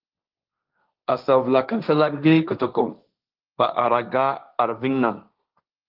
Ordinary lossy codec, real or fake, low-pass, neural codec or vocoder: Opus, 32 kbps; fake; 5.4 kHz; codec, 16 kHz, 1.1 kbps, Voila-Tokenizer